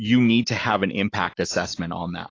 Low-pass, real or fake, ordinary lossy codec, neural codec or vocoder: 7.2 kHz; fake; AAC, 32 kbps; autoencoder, 48 kHz, 128 numbers a frame, DAC-VAE, trained on Japanese speech